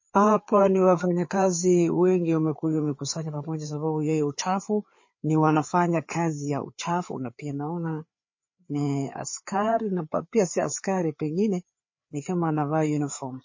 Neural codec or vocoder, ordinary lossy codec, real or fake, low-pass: codec, 16 kHz, 4 kbps, FreqCodec, larger model; MP3, 32 kbps; fake; 7.2 kHz